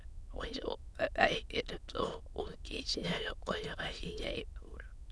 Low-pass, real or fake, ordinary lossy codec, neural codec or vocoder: none; fake; none; autoencoder, 22.05 kHz, a latent of 192 numbers a frame, VITS, trained on many speakers